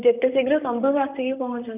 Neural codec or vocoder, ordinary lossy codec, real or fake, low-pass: vocoder, 44.1 kHz, 128 mel bands, Pupu-Vocoder; none; fake; 3.6 kHz